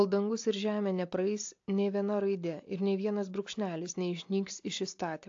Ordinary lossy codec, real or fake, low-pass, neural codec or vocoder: AAC, 48 kbps; real; 7.2 kHz; none